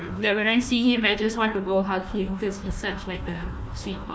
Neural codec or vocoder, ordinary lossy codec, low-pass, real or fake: codec, 16 kHz, 1 kbps, FunCodec, trained on Chinese and English, 50 frames a second; none; none; fake